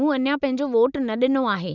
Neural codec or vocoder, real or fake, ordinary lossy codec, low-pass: none; real; none; 7.2 kHz